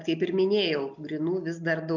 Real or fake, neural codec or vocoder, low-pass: real; none; 7.2 kHz